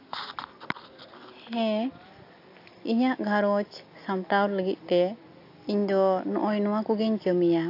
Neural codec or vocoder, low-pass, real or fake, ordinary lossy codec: none; 5.4 kHz; real; AAC, 32 kbps